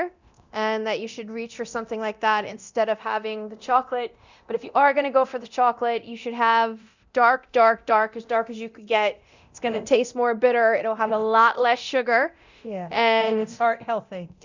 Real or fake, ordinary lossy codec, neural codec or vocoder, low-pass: fake; Opus, 64 kbps; codec, 24 kHz, 0.9 kbps, DualCodec; 7.2 kHz